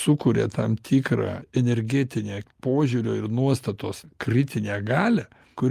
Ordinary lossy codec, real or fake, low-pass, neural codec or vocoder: Opus, 32 kbps; real; 14.4 kHz; none